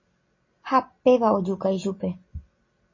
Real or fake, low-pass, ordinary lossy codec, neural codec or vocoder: real; 7.2 kHz; MP3, 32 kbps; none